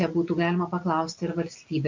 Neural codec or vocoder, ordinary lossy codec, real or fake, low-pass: none; MP3, 64 kbps; real; 7.2 kHz